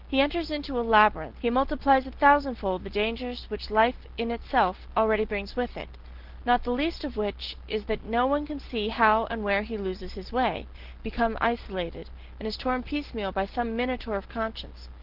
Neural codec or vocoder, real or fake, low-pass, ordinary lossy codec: none; real; 5.4 kHz; Opus, 16 kbps